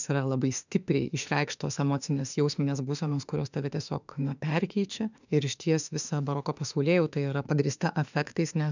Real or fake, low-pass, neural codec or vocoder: fake; 7.2 kHz; autoencoder, 48 kHz, 32 numbers a frame, DAC-VAE, trained on Japanese speech